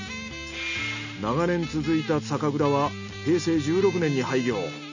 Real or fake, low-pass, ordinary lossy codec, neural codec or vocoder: real; 7.2 kHz; none; none